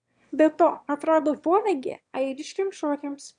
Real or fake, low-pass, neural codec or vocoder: fake; 9.9 kHz; autoencoder, 22.05 kHz, a latent of 192 numbers a frame, VITS, trained on one speaker